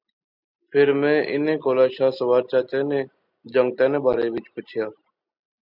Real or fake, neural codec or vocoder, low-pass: real; none; 5.4 kHz